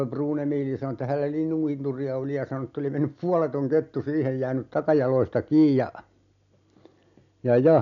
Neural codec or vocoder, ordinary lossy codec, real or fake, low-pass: none; none; real; 7.2 kHz